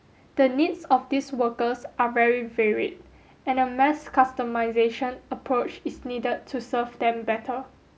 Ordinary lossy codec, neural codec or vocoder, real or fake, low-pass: none; none; real; none